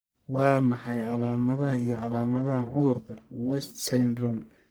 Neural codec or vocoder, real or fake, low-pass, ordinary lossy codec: codec, 44.1 kHz, 1.7 kbps, Pupu-Codec; fake; none; none